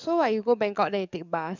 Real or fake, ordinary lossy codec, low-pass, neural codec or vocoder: fake; none; 7.2 kHz; codec, 44.1 kHz, 7.8 kbps, DAC